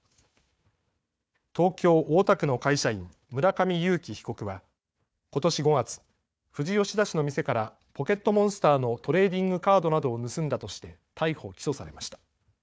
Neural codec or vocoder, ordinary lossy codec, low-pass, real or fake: codec, 16 kHz, 4 kbps, FunCodec, trained on Chinese and English, 50 frames a second; none; none; fake